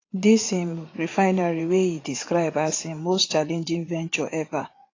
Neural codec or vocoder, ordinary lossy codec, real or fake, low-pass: vocoder, 44.1 kHz, 80 mel bands, Vocos; AAC, 32 kbps; fake; 7.2 kHz